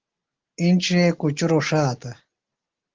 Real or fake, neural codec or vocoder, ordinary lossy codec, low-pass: real; none; Opus, 16 kbps; 7.2 kHz